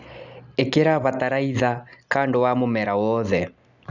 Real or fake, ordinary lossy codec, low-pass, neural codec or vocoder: real; none; 7.2 kHz; none